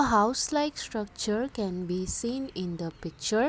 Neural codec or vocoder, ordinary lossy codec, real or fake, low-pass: none; none; real; none